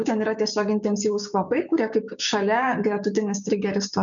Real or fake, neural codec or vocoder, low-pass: fake; codec, 16 kHz, 6 kbps, DAC; 7.2 kHz